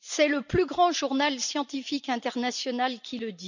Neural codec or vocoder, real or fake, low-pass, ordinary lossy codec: none; real; 7.2 kHz; none